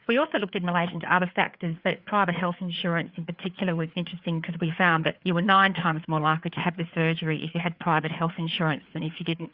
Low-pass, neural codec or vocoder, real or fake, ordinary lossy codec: 5.4 kHz; codec, 16 kHz, 4 kbps, FreqCodec, larger model; fake; Opus, 64 kbps